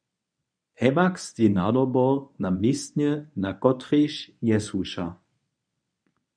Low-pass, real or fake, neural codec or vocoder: 9.9 kHz; fake; codec, 24 kHz, 0.9 kbps, WavTokenizer, medium speech release version 1